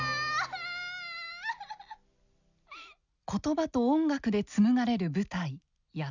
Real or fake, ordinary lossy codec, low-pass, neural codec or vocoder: real; none; 7.2 kHz; none